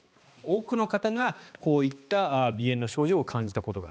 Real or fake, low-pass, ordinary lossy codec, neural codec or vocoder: fake; none; none; codec, 16 kHz, 2 kbps, X-Codec, HuBERT features, trained on balanced general audio